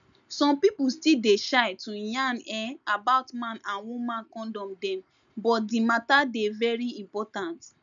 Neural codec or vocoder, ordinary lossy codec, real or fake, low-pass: none; none; real; 7.2 kHz